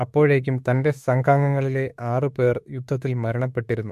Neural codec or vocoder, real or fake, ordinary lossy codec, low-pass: autoencoder, 48 kHz, 32 numbers a frame, DAC-VAE, trained on Japanese speech; fake; MP3, 64 kbps; 14.4 kHz